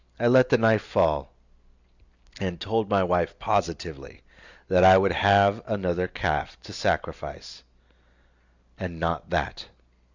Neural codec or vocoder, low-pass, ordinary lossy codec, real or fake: none; 7.2 kHz; Opus, 64 kbps; real